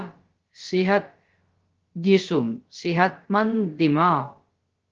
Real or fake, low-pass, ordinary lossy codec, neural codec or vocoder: fake; 7.2 kHz; Opus, 16 kbps; codec, 16 kHz, about 1 kbps, DyCAST, with the encoder's durations